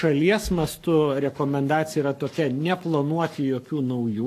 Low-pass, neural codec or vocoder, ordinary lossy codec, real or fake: 14.4 kHz; codec, 44.1 kHz, 7.8 kbps, Pupu-Codec; AAC, 64 kbps; fake